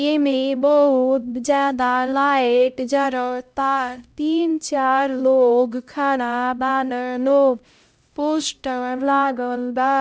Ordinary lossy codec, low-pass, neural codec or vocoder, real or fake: none; none; codec, 16 kHz, 0.5 kbps, X-Codec, HuBERT features, trained on LibriSpeech; fake